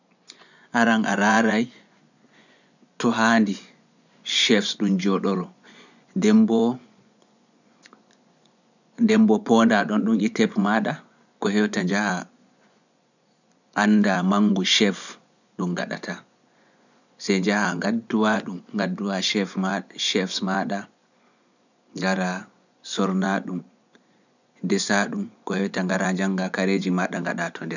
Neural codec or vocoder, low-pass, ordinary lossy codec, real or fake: vocoder, 24 kHz, 100 mel bands, Vocos; 7.2 kHz; none; fake